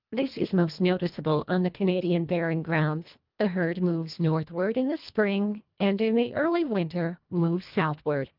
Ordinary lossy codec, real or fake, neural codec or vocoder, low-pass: Opus, 24 kbps; fake; codec, 24 kHz, 1.5 kbps, HILCodec; 5.4 kHz